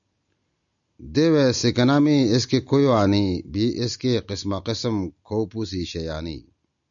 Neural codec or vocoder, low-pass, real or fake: none; 7.2 kHz; real